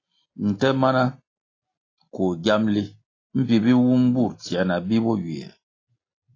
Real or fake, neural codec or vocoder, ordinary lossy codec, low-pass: real; none; AAC, 32 kbps; 7.2 kHz